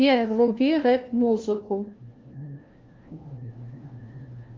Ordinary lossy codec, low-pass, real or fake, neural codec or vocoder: Opus, 32 kbps; 7.2 kHz; fake; codec, 16 kHz, 1 kbps, FunCodec, trained on LibriTTS, 50 frames a second